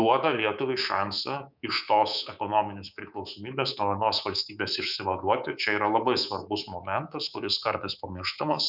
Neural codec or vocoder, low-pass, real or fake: codec, 24 kHz, 3.1 kbps, DualCodec; 5.4 kHz; fake